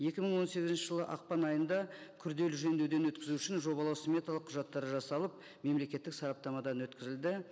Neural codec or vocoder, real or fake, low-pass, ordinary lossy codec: none; real; none; none